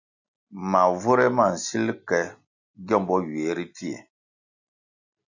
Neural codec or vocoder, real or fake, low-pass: none; real; 7.2 kHz